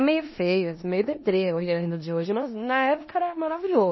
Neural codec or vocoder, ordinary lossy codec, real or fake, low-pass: codec, 16 kHz in and 24 kHz out, 0.9 kbps, LongCat-Audio-Codec, four codebook decoder; MP3, 24 kbps; fake; 7.2 kHz